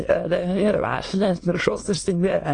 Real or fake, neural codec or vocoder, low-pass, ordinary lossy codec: fake; autoencoder, 22.05 kHz, a latent of 192 numbers a frame, VITS, trained on many speakers; 9.9 kHz; AAC, 32 kbps